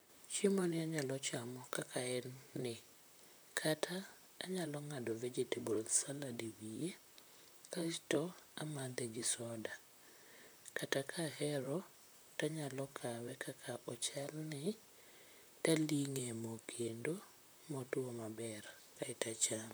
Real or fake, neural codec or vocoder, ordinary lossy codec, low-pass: fake; vocoder, 44.1 kHz, 128 mel bands, Pupu-Vocoder; none; none